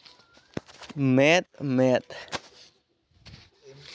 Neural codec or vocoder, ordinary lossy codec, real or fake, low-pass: none; none; real; none